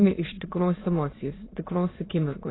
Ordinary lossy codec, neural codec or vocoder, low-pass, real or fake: AAC, 16 kbps; autoencoder, 22.05 kHz, a latent of 192 numbers a frame, VITS, trained on many speakers; 7.2 kHz; fake